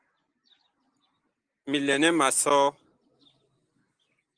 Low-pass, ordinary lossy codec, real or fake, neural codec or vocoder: 9.9 kHz; Opus, 24 kbps; real; none